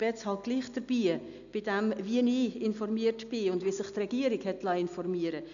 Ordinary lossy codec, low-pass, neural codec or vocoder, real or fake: none; 7.2 kHz; none; real